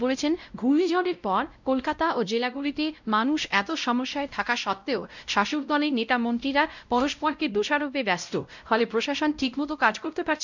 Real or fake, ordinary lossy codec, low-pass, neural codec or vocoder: fake; none; 7.2 kHz; codec, 16 kHz, 0.5 kbps, X-Codec, WavLM features, trained on Multilingual LibriSpeech